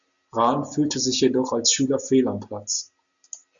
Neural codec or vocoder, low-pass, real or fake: none; 7.2 kHz; real